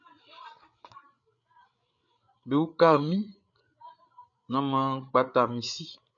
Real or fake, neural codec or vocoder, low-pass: fake; codec, 16 kHz, 8 kbps, FreqCodec, larger model; 7.2 kHz